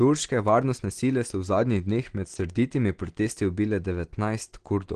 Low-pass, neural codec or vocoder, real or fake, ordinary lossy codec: 9.9 kHz; none; real; Opus, 16 kbps